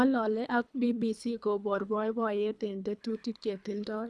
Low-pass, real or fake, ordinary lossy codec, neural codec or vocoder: none; fake; none; codec, 24 kHz, 3 kbps, HILCodec